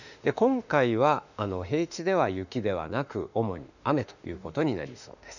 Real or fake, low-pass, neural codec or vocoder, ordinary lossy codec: fake; 7.2 kHz; autoencoder, 48 kHz, 32 numbers a frame, DAC-VAE, trained on Japanese speech; none